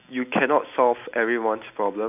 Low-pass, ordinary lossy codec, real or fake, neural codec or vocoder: 3.6 kHz; none; real; none